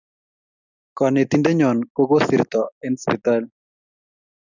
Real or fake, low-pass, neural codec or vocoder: real; 7.2 kHz; none